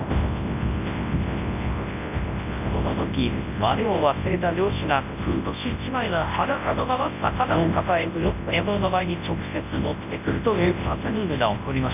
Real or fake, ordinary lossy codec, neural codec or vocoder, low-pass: fake; none; codec, 24 kHz, 0.9 kbps, WavTokenizer, large speech release; 3.6 kHz